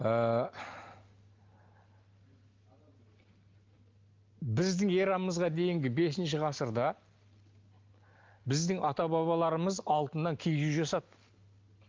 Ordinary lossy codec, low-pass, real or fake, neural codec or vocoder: Opus, 32 kbps; 7.2 kHz; real; none